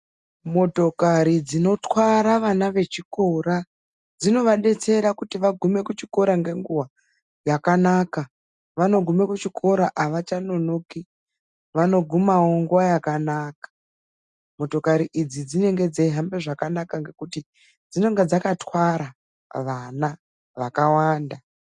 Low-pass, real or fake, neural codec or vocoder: 10.8 kHz; real; none